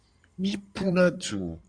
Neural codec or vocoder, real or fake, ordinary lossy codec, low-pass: codec, 16 kHz in and 24 kHz out, 2.2 kbps, FireRedTTS-2 codec; fake; Opus, 64 kbps; 9.9 kHz